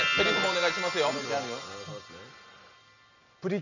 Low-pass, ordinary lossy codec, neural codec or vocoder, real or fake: 7.2 kHz; none; none; real